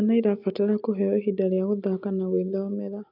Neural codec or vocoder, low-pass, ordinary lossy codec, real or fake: none; 5.4 kHz; none; real